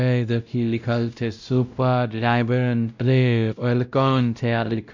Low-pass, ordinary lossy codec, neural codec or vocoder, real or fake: 7.2 kHz; none; codec, 16 kHz, 0.5 kbps, X-Codec, WavLM features, trained on Multilingual LibriSpeech; fake